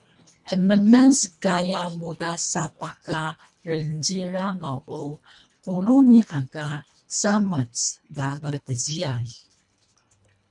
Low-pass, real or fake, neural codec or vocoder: 10.8 kHz; fake; codec, 24 kHz, 1.5 kbps, HILCodec